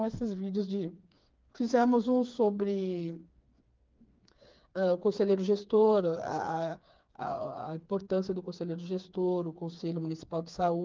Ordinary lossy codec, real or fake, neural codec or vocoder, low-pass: Opus, 24 kbps; fake; codec, 16 kHz, 4 kbps, FreqCodec, smaller model; 7.2 kHz